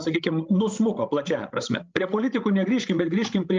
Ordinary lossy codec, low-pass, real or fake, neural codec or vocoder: Opus, 24 kbps; 7.2 kHz; fake; codec, 16 kHz, 16 kbps, FreqCodec, larger model